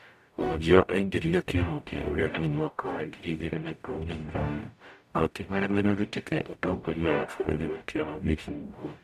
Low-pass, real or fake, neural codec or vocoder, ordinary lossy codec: 14.4 kHz; fake; codec, 44.1 kHz, 0.9 kbps, DAC; none